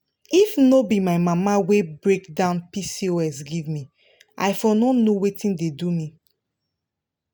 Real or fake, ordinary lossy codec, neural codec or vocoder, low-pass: real; none; none; none